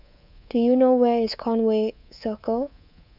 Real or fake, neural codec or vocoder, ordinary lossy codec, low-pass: fake; codec, 24 kHz, 3.1 kbps, DualCodec; none; 5.4 kHz